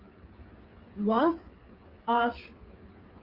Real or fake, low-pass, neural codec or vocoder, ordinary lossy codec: fake; 5.4 kHz; codec, 16 kHz, 8 kbps, FreqCodec, smaller model; Opus, 32 kbps